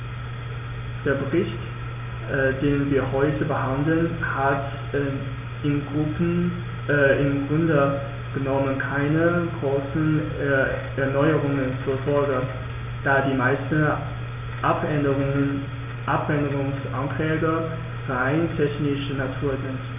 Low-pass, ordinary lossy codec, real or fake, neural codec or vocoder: 3.6 kHz; none; real; none